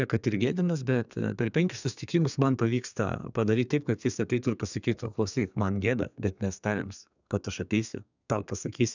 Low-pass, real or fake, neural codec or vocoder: 7.2 kHz; fake; codec, 32 kHz, 1.9 kbps, SNAC